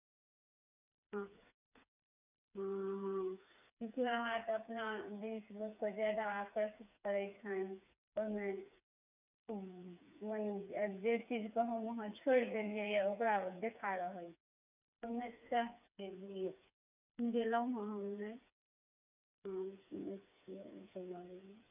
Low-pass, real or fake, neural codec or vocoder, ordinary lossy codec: 3.6 kHz; fake; codec, 16 kHz, 4 kbps, FreqCodec, smaller model; none